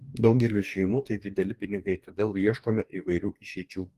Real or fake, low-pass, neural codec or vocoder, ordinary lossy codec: fake; 14.4 kHz; codec, 44.1 kHz, 2.6 kbps, DAC; Opus, 24 kbps